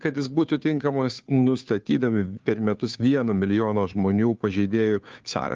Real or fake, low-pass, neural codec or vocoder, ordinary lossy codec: fake; 7.2 kHz; codec, 16 kHz, 2 kbps, FunCodec, trained on Chinese and English, 25 frames a second; Opus, 32 kbps